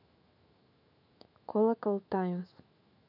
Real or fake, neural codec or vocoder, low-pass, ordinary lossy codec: fake; autoencoder, 48 kHz, 128 numbers a frame, DAC-VAE, trained on Japanese speech; 5.4 kHz; none